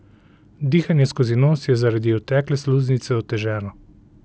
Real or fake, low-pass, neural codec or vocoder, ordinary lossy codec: real; none; none; none